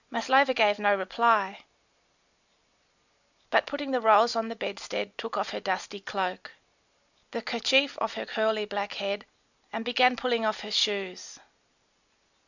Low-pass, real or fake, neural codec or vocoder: 7.2 kHz; real; none